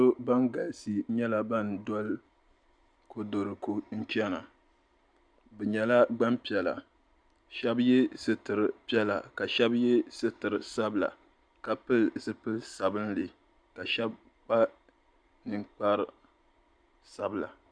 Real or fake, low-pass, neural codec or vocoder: real; 9.9 kHz; none